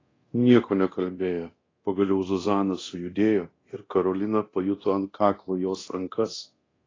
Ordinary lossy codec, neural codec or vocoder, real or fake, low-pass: AAC, 32 kbps; codec, 24 kHz, 0.9 kbps, DualCodec; fake; 7.2 kHz